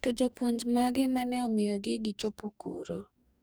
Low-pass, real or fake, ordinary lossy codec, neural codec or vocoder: none; fake; none; codec, 44.1 kHz, 2.6 kbps, DAC